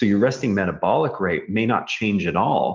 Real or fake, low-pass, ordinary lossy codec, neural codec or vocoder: real; 7.2 kHz; Opus, 32 kbps; none